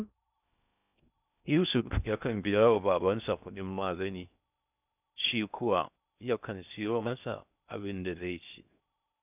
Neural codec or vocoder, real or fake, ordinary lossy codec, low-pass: codec, 16 kHz in and 24 kHz out, 0.6 kbps, FocalCodec, streaming, 4096 codes; fake; none; 3.6 kHz